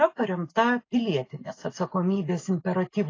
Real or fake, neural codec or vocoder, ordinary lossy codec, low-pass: real; none; AAC, 32 kbps; 7.2 kHz